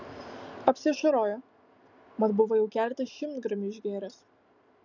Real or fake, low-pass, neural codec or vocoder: real; 7.2 kHz; none